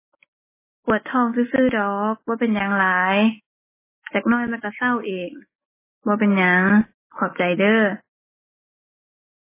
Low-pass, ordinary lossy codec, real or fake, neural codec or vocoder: 3.6 kHz; MP3, 16 kbps; real; none